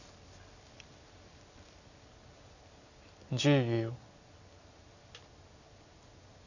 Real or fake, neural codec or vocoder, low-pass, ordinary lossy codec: real; none; 7.2 kHz; none